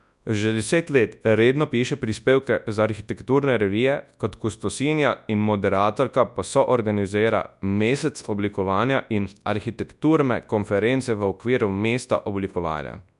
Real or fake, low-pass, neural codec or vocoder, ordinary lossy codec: fake; 10.8 kHz; codec, 24 kHz, 0.9 kbps, WavTokenizer, large speech release; none